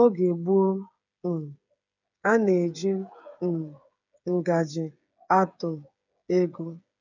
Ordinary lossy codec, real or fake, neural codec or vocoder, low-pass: none; fake; codec, 16 kHz, 8 kbps, FreqCodec, smaller model; 7.2 kHz